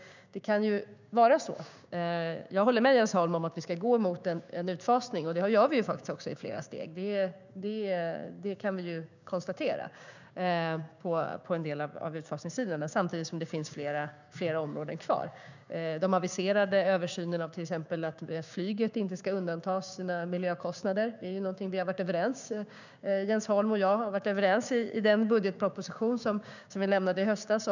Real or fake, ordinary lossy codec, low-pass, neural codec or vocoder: fake; none; 7.2 kHz; codec, 16 kHz, 6 kbps, DAC